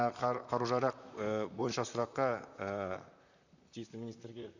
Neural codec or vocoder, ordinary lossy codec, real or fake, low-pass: vocoder, 44.1 kHz, 128 mel bands, Pupu-Vocoder; none; fake; 7.2 kHz